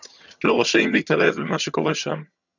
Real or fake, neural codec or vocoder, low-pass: fake; vocoder, 22.05 kHz, 80 mel bands, HiFi-GAN; 7.2 kHz